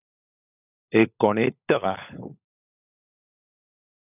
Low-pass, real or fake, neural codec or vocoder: 3.6 kHz; fake; codec, 16 kHz, 16 kbps, FunCodec, trained on LibriTTS, 50 frames a second